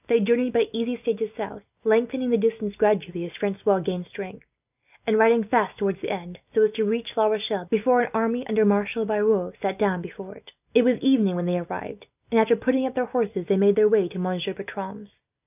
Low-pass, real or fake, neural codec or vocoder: 3.6 kHz; real; none